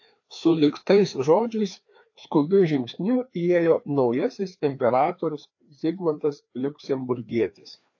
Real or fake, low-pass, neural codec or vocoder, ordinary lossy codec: fake; 7.2 kHz; codec, 16 kHz, 2 kbps, FreqCodec, larger model; AAC, 48 kbps